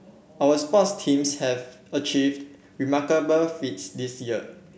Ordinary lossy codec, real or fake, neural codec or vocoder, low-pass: none; real; none; none